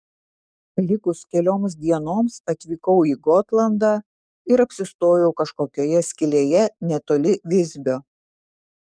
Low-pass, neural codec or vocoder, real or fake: 9.9 kHz; codec, 44.1 kHz, 7.8 kbps, DAC; fake